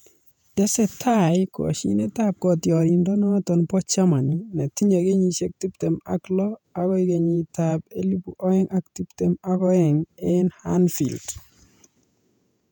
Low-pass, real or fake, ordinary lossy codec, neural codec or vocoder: 19.8 kHz; fake; none; vocoder, 48 kHz, 128 mel bands, Vocos